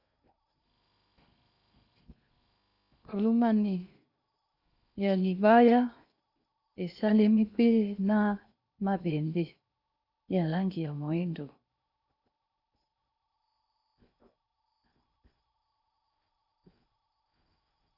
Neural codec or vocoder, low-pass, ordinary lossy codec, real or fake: codec, 16 kHz in and 24 kHz out, 0.8 kbps, FocalCodec, streaming, 65536 codes; 5.4 kHz; Opus, 64 kbps; fake